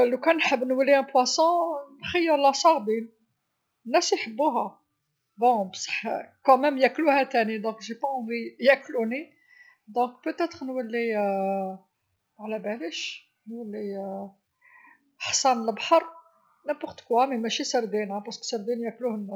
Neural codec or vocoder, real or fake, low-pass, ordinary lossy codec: none; real; none; none